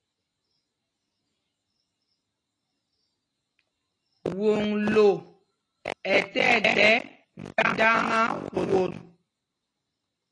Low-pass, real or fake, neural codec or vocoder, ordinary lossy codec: 9.9 kHz; real; none; AAC, 32 kbps